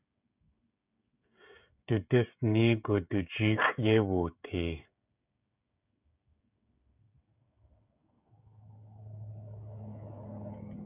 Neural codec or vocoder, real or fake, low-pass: codec, 16 kHz, 16 kbps, FreqCodec, smaller model; fake; 3.6 kHz